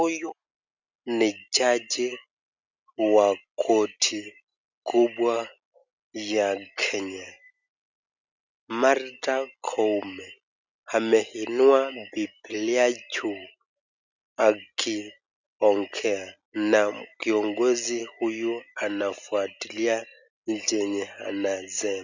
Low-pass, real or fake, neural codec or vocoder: 7.2 kHz; real; none